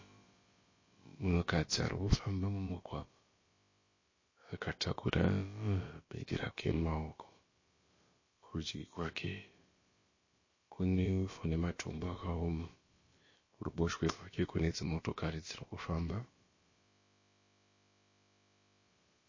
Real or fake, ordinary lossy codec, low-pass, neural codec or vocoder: fake; MP3, 32 kbps; 7.2 kHz; codec, 16 kHz, about 1 kbps, DyCAST, with the encoder's durations